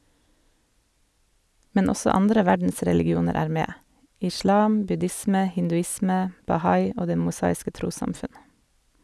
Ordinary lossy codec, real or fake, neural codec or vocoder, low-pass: none; real; none; none